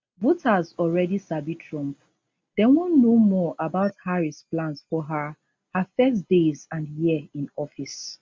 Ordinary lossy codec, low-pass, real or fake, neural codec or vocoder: none; none; real; none